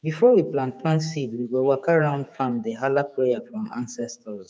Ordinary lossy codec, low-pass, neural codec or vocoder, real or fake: none; none; codec, 16 kHz, 4 kbps, X-Codec, HuBERT features, trained on general audio; fake